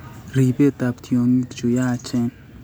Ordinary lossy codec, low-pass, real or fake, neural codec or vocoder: none; none; real; none